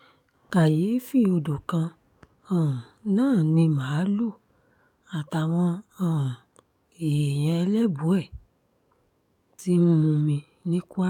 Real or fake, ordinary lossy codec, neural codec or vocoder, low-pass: fake; none; codec, 44.1 kHz, 7.8 kbps, DAC; 19.8 kHz